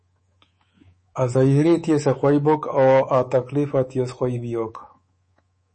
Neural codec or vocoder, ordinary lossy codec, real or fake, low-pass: codec, 24 kHz, 3.1 kbps, DualCodec; MP3, 32 kbps; fake; 10.8 kHz